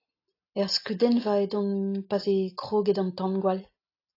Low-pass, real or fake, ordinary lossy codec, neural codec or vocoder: 5.4 kHz; real; AAC, 32 kbps; none